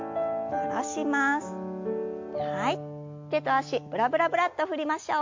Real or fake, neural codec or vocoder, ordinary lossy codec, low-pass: real; none; MP3, 48 kbps; 7.2 kHz